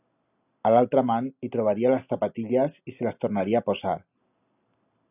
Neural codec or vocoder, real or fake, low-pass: none; real; 3.6 kHz